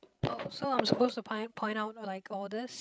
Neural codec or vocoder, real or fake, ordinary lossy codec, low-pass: codec, 16 kHz, 16 kbps, FreqCodec, larger model; fake; none; none